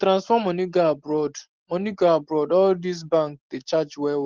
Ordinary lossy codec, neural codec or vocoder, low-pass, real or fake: Opus, 16 kbps; none; 7.2 kHz; real